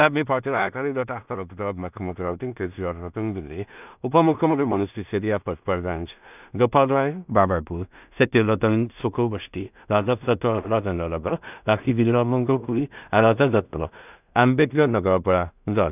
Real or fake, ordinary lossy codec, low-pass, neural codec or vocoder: fake; none; 3.6 kHz; codec, 16 kHz in and 24 kHz out, 0.4 kbps, LongCat-Audio-Codec, two codebook decoder